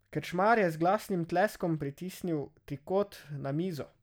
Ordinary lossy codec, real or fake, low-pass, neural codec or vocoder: none; real; none; none